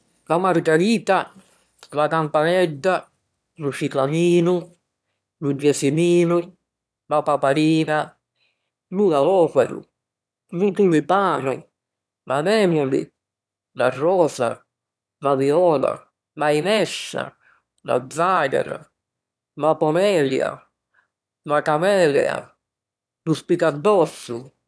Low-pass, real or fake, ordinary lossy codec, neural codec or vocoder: none; fake; none; autoencoder, 22.05 kHz, a latent of 192 numbers a frame, VITS, trained on one speaker